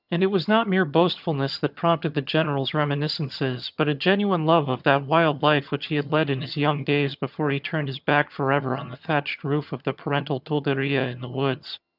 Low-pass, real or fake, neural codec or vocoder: 5.4 kHz; fake; vocoder, 22.05 kHz, 80 mel bands, HiFi-GAN